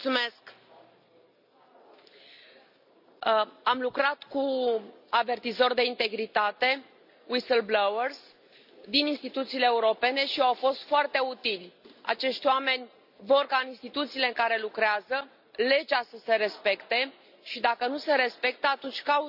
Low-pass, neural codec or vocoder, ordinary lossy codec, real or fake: 5.4 kHz; none; none; real